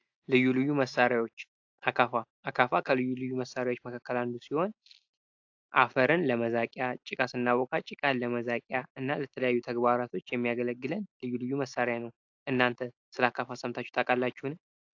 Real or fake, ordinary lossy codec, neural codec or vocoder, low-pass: real; AAC, 48 kbps; none; 7.2 kHz